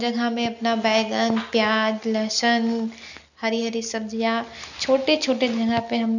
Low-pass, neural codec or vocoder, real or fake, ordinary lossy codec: 7.2 kHz; none; real; none